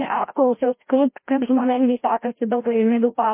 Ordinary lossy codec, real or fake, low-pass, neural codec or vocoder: MP3, 24 kbps; fake; 3.6 kHz; codec, 16 kHz, 0.5 kbps, FreqCodec, larger model